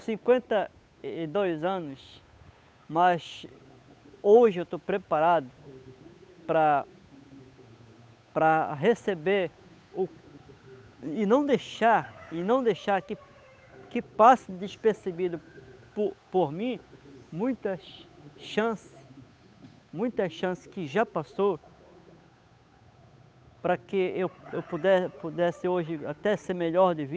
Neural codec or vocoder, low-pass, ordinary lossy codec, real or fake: codec, 16 kHz, 8 kbps, FunCodec, trained on Chinese and English, 25 frames a second; none; none; fake